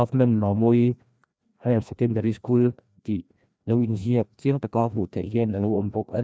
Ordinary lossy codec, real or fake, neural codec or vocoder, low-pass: none; fake; codec, 16 kHz, 1 kbps, FreqCodec, larger model; none